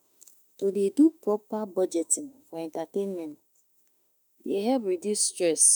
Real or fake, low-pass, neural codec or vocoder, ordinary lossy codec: fake; none; autoencoder, 48 kHz, 32 numbers a frame, DAC-VAE, trained on Japanese speech; none